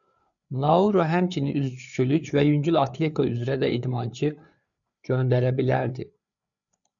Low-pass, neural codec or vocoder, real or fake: 7.2 kHz; codec, 16 kHz, 4 kbps, FreqCodec, larger model; fake